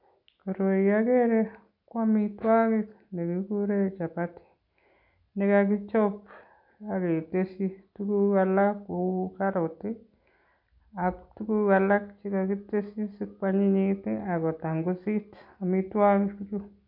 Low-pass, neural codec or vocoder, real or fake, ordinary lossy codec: 5.4 kHz; none; real; none